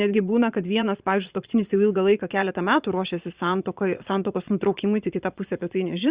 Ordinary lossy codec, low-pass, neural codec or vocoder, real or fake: Opus, 64 kbps; 3.6 kHz; vocoder, 22.05 kHz, 80 mel bands, Vocos; fake